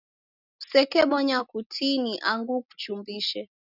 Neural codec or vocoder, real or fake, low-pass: none; real; 5.4 kHz